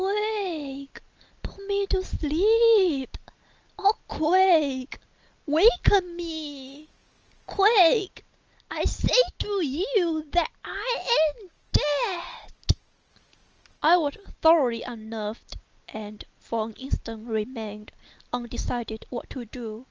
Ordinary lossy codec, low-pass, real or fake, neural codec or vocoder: Opus, 24 kbps; 7.2 kHz; real; none